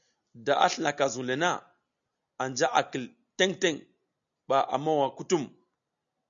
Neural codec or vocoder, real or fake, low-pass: none; real; 7.2 kHz